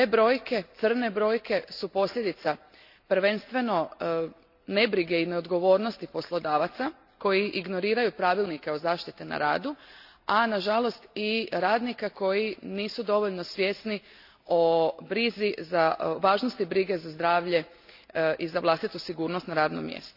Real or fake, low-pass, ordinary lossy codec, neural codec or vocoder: real; 5.4 kHz; AAC, 48 kbps; none